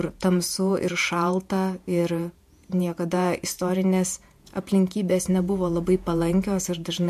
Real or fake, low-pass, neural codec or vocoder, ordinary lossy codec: fake; 14.4 kHz; vocoder, 48 kHz, 128 mel bands, Vocos; MP3, 64 kbps